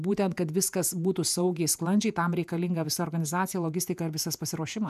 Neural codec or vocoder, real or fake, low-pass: vocoder, 48 kHz, 128 mel bands, Vocos; fake; 14.4 kHz